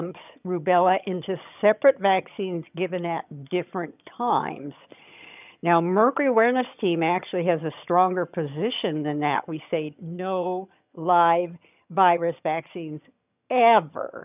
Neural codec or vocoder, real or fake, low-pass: vocoder, 22.05 kHz, 80 mel bands, HiFi-GAN; fake; 3.6 kHz